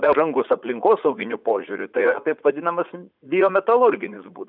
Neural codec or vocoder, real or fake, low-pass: vocoder, 44.1 kHz, 80 mel bands, Vocos; fake; 5.4 kHz